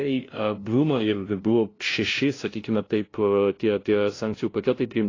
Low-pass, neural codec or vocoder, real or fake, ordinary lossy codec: 7.2 kHz; codec, 16 kHz, 0.5 kbps, FunCodec, trained on LibriTTS, 25 frames a second; fake; AAC, 32 kbps